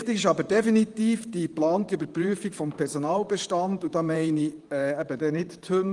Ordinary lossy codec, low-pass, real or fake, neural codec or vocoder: Opus, 24 kbps; 10.8 kHz; fake; vocoder, 44.1 kHz, 128 mel bands, Pupu-Vocoder